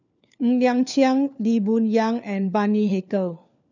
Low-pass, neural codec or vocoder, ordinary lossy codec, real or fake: 7.2 kHz; codec, 16 kHz, 4 kbps, FunCodec, trained on LibriTTS, 50 frames a second; none; fake